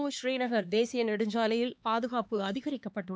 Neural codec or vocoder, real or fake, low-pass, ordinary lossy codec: codec, 16 kHz, 2 kbps, X-Codec, HuBERT features, trained on LibriSpeech; fake; none; none